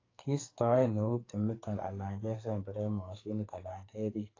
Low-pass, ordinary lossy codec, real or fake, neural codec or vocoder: 7.2 kHz; AAC, 32 kbps; fake; codec, 44.1 kHz, 2.6 kbps, SNAC